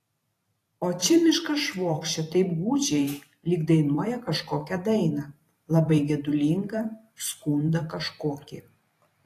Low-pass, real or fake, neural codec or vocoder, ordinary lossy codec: 14.4 kHz; fake; vocoder, 44.1 kHz, 128 mel bands every 512 samples, BigVGAN v2; AAC, 48 kbps